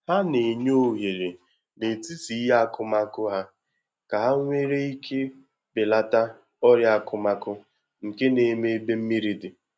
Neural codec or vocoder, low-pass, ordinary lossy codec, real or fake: none; none; none; real